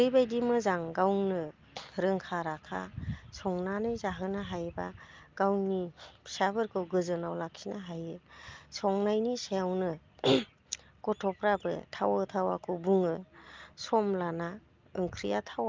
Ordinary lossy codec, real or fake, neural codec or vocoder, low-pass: Opus, 24 kbps; real; none; 7.2 kHz